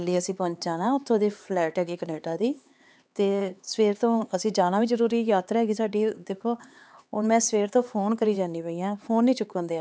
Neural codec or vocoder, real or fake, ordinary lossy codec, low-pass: codec, 16 kHz, 4 kbps, X-Codec, HuBERT features, trained on LibriSpeech; fake; none; none